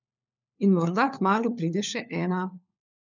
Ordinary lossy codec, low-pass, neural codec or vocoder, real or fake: none; 7.2 kHz; codec, 16 kHz, 4 kbps, FunCodec, trained on LibriTTS, 50 frames a second; fake